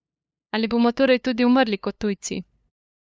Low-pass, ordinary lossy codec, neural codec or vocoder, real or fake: none; none; codec, 16 kHz, 2 kbps, FunCodec, trained on LibriTTS, 25 frames a second; fake